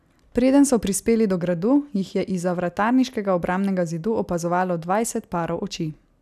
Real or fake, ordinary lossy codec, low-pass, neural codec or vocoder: real; none; 14.4 kHz; none